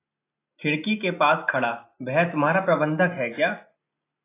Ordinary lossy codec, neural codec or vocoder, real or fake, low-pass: AAC, 24 kbps; none; real; 3.6 kHz